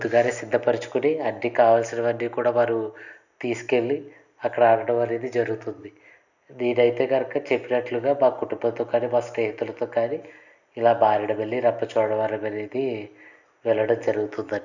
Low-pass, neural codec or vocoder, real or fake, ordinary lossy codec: 7.2 kHz; none; real; none